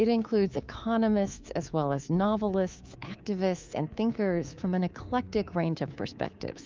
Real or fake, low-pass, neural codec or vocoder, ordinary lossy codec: fake; 7.2 kHz; codec, 24 kHz, 3.1 kbps, DualCodec; Opus, 24 kbps